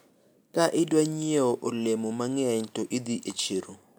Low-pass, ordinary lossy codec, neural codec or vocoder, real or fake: none; none; none; real